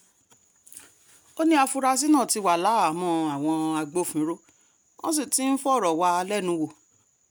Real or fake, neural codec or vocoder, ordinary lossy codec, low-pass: real; none; none; none